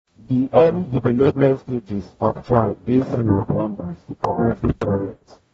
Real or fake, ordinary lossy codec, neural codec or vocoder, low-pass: fake; AAC, 24 kbps; codec, 44.1 kHz, 0.9 kbps, DAC; 19.8 kHz